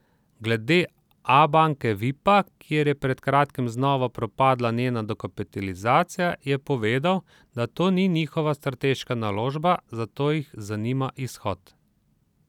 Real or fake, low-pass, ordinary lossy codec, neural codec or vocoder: real; 19.8 kHz; none; none